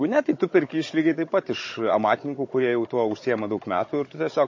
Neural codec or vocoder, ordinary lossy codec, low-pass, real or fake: codec, 16 kHz, 16 kbps, FunCodec, trained on Chinese and English, 50 frames a second; MP3, 32 kbps; 7.2 kHz; fake